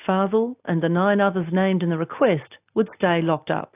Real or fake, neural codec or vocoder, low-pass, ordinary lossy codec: real; none; 3.6 kHz; AAC, 32 kbps